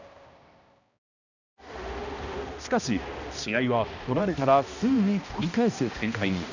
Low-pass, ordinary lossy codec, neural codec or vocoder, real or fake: 7.2 kHz; none; codec, 16 kHz, 1 kbps, X-Codec, HuBERT features, trained on balanced general audio; fake